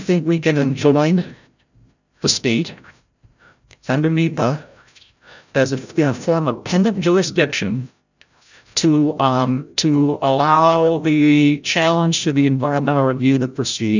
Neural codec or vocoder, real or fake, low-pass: codec, 16 kHz, 0.5 kbps, FreqCodec, larger model; fake; 7.2 kHz